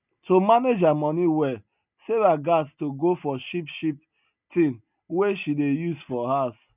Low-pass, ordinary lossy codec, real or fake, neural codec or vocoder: 3.6 kHz; none; real; none